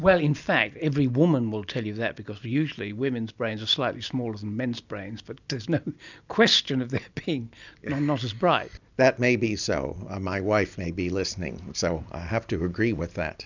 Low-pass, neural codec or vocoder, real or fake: 7.2 kHz; none; real